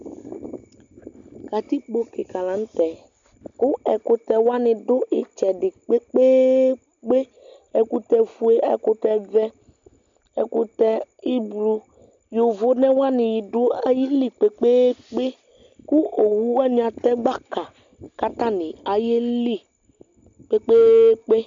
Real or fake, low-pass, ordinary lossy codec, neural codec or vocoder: real; 7.2 kHz; MP3, 64 kbps; none